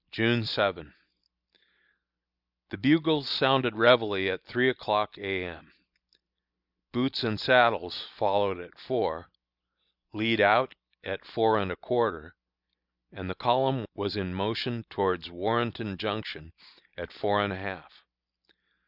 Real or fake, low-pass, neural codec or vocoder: real; 5.4 kHz; none